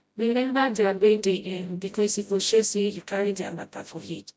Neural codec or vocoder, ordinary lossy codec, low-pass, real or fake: codec, 16 kHz, 0.5 kbps, FreqCodec, smaller model; none; none; fake